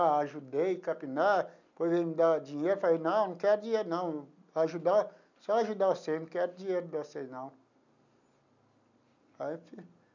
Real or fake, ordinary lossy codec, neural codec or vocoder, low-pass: real; none; none; 7.2 kHz